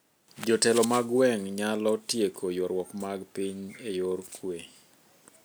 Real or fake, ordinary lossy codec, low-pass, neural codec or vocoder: real; none; none; none